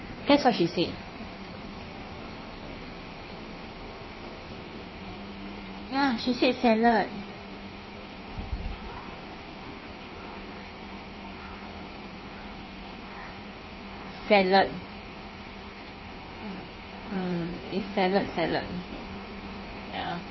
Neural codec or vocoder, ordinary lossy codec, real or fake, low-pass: codec, 16 kHz in and 24 kHz out, 1.1 kbps, FireRedTTS-2 codec; MP3, 24 kbps; fake; 7.2 kHz